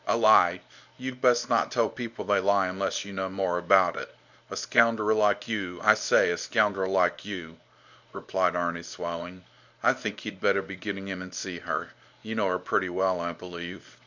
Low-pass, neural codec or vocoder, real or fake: 7.2 kHz; codec, 24 kHz, 0.9 kbps, WavTokenizer, medium speech release version 1; fake